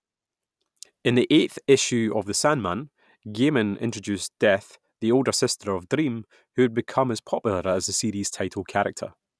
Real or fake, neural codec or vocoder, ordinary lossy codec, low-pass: real; none; none; none